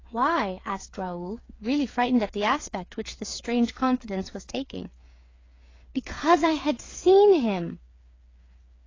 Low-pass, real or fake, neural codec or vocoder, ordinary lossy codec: 7.2 kHz; fake; codec, 16 kHz, 8 kbps, FreqCodec, smaller model; AAC, 32 kbps